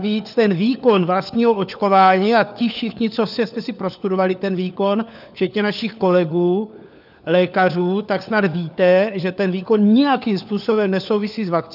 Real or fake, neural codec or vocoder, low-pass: fake; codec, 16 kHz, 4 kbps, FunCodec, trained on LibriTTS, 50 frames a second; 5.4 kHz